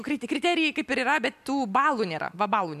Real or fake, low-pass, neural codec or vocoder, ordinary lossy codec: real; 14.4 kHz; none; MP3, 96 kbps